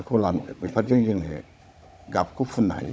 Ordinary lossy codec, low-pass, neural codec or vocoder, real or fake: none; none; codec, 16 kHz, 16 kbps, FunCodec, trained on Chinese and English, 50 frames a second; fake